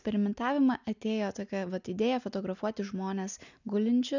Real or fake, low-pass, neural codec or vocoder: real; 7.2 kHz; none